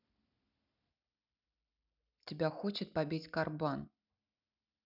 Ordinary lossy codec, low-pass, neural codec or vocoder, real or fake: none; 5.4 kHz; none; real